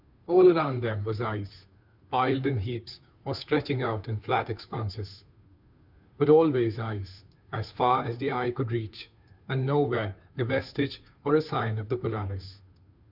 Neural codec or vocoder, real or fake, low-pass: codec, 16 kHz, 2 kbps, FunCodec, trained on Chinese and English, 25 frames a second; fake; 5.4 kHz